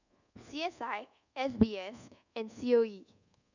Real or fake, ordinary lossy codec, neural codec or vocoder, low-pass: fake; none; codec, 24 kHz, 1.2 kbps, DualCodec; 7.2 kHz